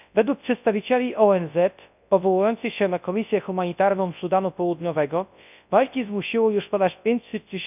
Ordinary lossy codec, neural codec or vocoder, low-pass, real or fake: none; codec, 24 kHz, 0.9 kbps, WavTokenizer, large speech release; 3.6 kHz; fake